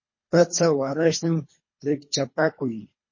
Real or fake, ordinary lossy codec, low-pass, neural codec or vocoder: fake; MP3, 32 kbps; 7.2 kHz; codec, 24 kHz, 3 kbps, HILCodec